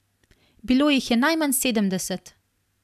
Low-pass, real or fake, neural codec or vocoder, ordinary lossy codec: 14.4 kHz; real; none; none